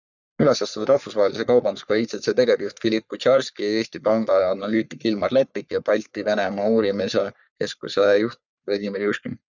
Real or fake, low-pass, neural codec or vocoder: fake; 7.2 kHz; codec, 44.1 kHz, 3.4 kbps, Pupu-Codec